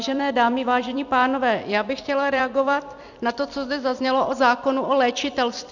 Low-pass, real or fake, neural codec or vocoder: 7.2 kHz; fake; vocoder, 44.1 kHz, 128 mel bands every 256 samples, BigVGAN v2